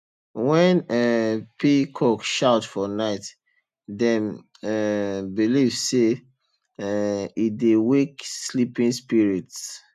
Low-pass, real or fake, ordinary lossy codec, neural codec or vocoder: 14.4 kHz; real; none; none